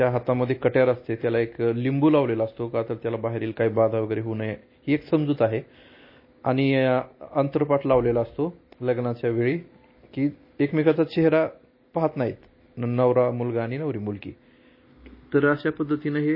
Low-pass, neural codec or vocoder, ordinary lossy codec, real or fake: 5.4 kHz; none; MP3, 24 kbps; real